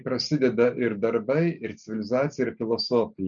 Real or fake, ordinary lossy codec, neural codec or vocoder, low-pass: real; MP3, 96 kbps; none; 7.2 kHz